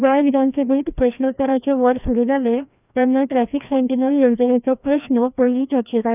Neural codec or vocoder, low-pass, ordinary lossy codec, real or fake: codec, 16 kHz, 1 kbps, FreqCodec, larger model; 3.6 kHz; none; fake